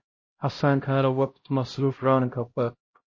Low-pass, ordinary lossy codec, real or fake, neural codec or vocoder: 7.2 kHz; MP3, 32 kbps; fake; codec, 16 kHz, 0.5 kbps, X-Codec, HuBERT features, trained on LibriSpeech